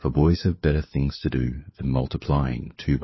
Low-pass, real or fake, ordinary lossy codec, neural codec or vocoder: 7.2 kHz; fake; MP3, 24 kbps; codec, 16 kHz, 4 kbps, FunCodec, trained on LibriTTS, 50 frames a second